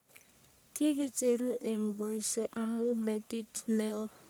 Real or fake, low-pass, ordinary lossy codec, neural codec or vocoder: fake; none; none; codec, 44.1 kHz, 1.7 kbps, Pupu-Codec